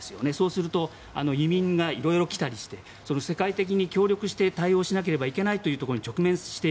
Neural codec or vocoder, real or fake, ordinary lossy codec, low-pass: none; real; none; none